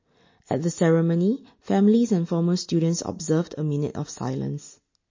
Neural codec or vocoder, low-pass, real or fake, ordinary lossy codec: none; 7.2 kHz; real; MP3, 32 kbps